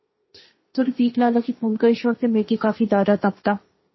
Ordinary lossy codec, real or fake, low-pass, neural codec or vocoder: MP3, 24 kbps; fake; 7.2 kHz; codec, 16 kHz, 1.1 kbps, Voila-Tokenizer